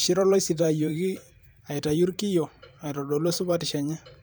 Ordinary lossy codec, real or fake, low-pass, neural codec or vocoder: none; fake; none; vocoder, 44.1 kHz, 128 mel bands every 512 samples, BigVGAN v2